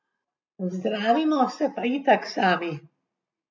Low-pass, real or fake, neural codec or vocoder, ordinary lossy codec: 7.2 kHz; real; none; none